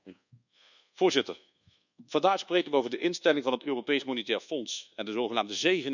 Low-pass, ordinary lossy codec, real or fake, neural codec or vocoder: 7.2 kHz; none; fake; codec, 24 kHz, 1.2 kbps, DualCodec